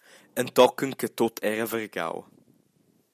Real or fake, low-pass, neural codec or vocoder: real; 14.4 kHz; none